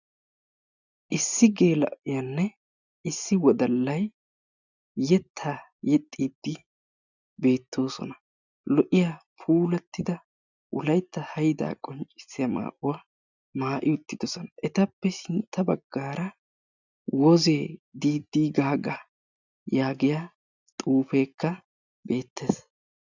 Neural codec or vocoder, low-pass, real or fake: none; 7.2 kHz; real